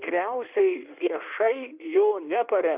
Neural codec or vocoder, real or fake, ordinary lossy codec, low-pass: codec, 16 kHz in and 24 kHz out, 1.1 kbps, FireRedTTS-2 codec; fake; AAC, 32 kbps; 3.6 kHz